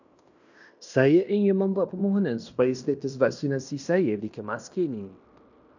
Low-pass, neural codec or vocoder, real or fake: 7.2 kHz; codec, 16 kHz in and 24 kHz out, 0.9 kbps, LongCat-Audio-Codec, fine tuned four codebook decoder; fake